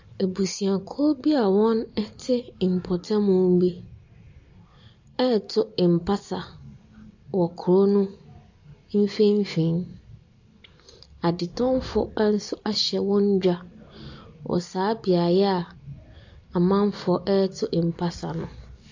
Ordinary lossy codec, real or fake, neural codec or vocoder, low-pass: AAC, 48 kbps; real; none; 7.2 kHz